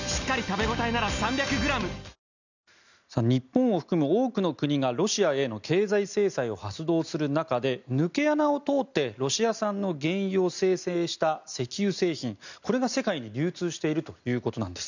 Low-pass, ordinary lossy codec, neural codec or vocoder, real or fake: 7.2 kHz; none; none; real